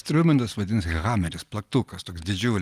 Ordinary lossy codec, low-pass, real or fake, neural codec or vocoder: Opus, 32 kbps; 14.4 kHz; real; none